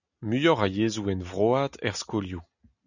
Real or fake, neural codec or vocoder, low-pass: real; none; 7.2 kHz